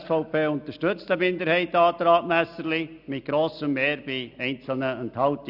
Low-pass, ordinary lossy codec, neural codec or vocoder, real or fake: 5.4 kHz; none; none; real